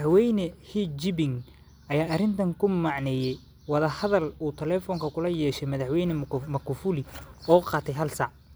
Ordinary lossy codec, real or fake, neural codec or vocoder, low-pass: none; real; none; none